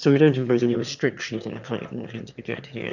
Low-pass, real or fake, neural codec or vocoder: 7.2 kHz; fake; autoencoder, 22.05 kHz, a latent of 192 numbers a frame, VITS, trained on one speaker